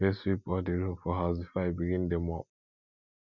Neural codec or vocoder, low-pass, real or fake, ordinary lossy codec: none; 7.2 kHz; real; none